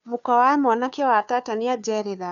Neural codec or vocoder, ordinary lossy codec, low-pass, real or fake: codec, 16 kHz, 2 kbps, FunCodec, trained on Chinese and English, 25 frames a second; none; 7.2 kHz; fake